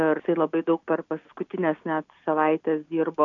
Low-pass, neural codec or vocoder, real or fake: 7.2 kHz; none; real